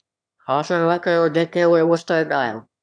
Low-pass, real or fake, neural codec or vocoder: 9.9 kHz; fake; autoencoder, 22.05 kHz, a latent of 192 numbers a frame, VITS, trained on one speaker